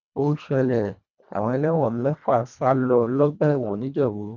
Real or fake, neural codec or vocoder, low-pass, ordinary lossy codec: fake; codec, 24 kHz, 1.5 kbps, HILCodec; 7.2 kHz; none